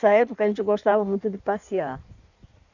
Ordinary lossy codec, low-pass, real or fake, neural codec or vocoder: none; 7.2 kHz; fake; codec, 16 kHz in and 24 kHz out, 1.1 kbps, FireRedTTS-2 codec